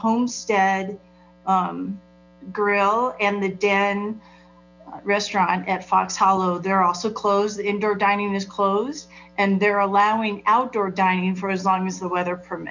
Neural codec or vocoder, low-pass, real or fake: none; 7.2 kHz; real